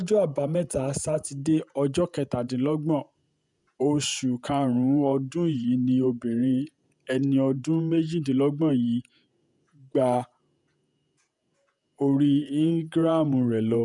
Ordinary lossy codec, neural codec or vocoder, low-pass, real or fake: none; vocoder, 48 kHz, 128 mel bands, Vocos; 10.8 kHz; fake